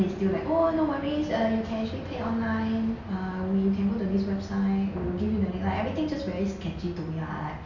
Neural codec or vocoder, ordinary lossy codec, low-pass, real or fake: none; none; 7.2 kHz; real